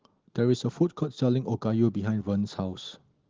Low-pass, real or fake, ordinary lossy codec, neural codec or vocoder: 7.2 kHz; real; Opus, 16 kbps; none